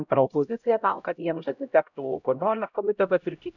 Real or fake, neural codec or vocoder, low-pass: fake; codec, 16 kHz, 0.5 kbps, X-Codec, HuBERT features, trained on LibriSpeech; 7.2 kHz